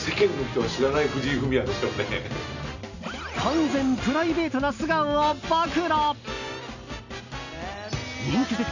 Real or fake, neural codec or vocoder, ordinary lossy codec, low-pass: real; none; none; 7.2 kHz